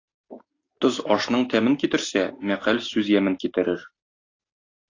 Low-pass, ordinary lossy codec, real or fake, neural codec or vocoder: 7.2 kHz; AAC, 32 kbps; real; none